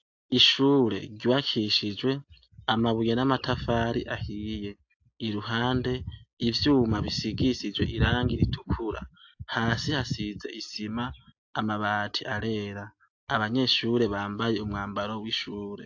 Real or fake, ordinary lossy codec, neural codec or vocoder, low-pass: real; AAC, 48 kbps; none; 7.2 kHz